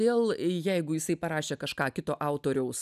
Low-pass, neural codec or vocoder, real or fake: 14.4 kHz; none; real